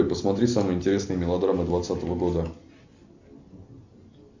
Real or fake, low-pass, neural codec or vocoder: real; 7.2 kHz; none